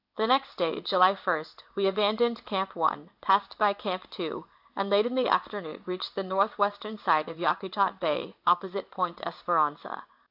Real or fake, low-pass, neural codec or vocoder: fake; 5.4 kHz; codec, 16 kHz in and 24 kHz out, 1 kbps, XY-Tokenizer